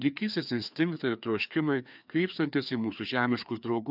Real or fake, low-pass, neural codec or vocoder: fake; 5.4 kHz; codec, 16 kHz, 2 kbps, FreqCodec, larger model